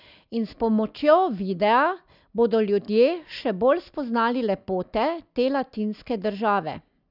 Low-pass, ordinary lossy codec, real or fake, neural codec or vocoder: 5.4 kHz; none; real; none